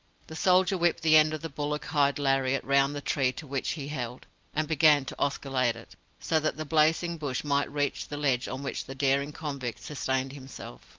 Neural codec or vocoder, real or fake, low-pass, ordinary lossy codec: none; real; 7.2 kHz; Opus, 24 kbps